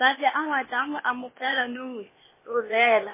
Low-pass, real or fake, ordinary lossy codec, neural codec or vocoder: 3.6 kHz; fake; MP3, 16 kbps; codec, 16 kHz, 0.8 kbps, ZipCodec